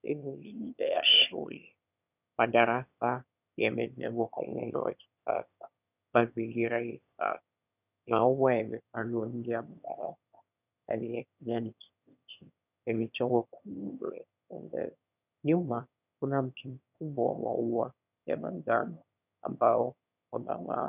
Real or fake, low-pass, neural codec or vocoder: fake; 3.6 kHz; autoencoder, 22.05 kHz, a latent of 192 numbers a frame, VITS, trained on one speaker